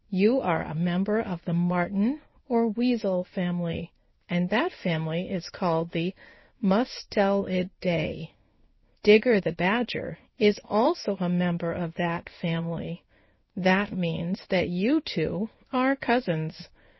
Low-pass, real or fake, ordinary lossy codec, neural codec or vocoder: 7.2 kHz; fake; MP3, 24 kbps; codec, 16 kHz in and 24 kHz out, 1 kbps, XY-Tokenizer